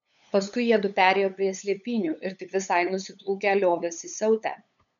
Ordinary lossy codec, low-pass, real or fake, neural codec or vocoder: MP3, 96 kbps; 7.2 kHz; fake; codec, 16 kHz, 8 kbps, FunCodec, trained on LibriTTS, 25 frames a second